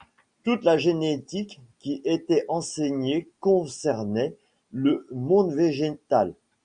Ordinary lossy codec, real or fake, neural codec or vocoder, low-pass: Opus, 64 kbps; real; none; 9.9 kHz